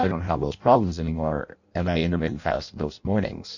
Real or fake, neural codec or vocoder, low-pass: fake; codec, 16 kHz in and 24 kHz out, 0.6 kbps, FireRedTTS-2 codec; 7.2 kHz